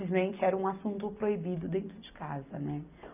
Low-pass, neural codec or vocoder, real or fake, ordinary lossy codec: 3.6 kHz; none; real; none